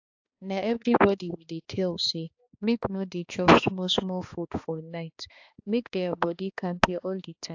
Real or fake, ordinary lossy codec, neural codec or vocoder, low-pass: fake; AAC, 48 kbps; codec, 16 kHz, 2 kbps, X-Codec, HuBERT features, trained on balanced general audio; 7.2 kHz